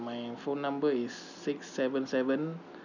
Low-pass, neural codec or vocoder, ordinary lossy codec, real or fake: 7.2 kHz; none; none; real